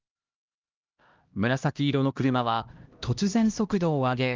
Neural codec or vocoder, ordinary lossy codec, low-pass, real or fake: codec, 16 kHz, 1 kbps, X-Codec, HuBERT features, trained on LibriSpeech; Opus, 32 kbps; 7.2 kHz; fake